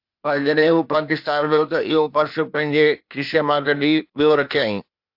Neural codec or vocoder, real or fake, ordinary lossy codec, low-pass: codec, 16 kHz, 0.8 kbps, ZipCodec; fake; AAC, 48 kbps; 5.4 kHz